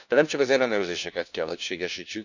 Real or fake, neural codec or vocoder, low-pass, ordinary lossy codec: fake; codec, 16 kHz, 1 kbps, FunCodec, trained on LibriTTS, 50 frames a second; 7.2 kHz; none